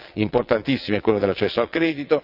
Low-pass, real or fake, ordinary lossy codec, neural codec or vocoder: 5.4 kHz; fake; none; vocoder, 22.05 kHz, 80 mel bands, WaveNeXt